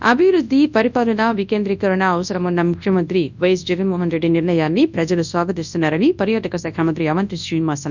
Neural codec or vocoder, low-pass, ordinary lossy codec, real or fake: codec, 24 kHz, 0.9 kbps, WavTokenizer, large speech release; 7.2 kHz; none; fake